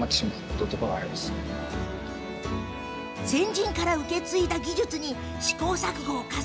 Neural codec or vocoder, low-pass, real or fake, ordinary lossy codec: none; none; real; none